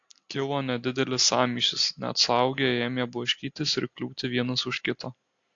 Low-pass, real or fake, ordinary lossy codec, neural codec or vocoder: 7.2 kHz; real; AAC, 48 kbps; none